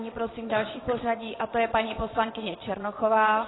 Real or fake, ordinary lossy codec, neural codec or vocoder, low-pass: fake; AAC, 16 kbps; vocoder, 22.05 kHz, 80 mel bands, WaveNeXt; 7.2 kHz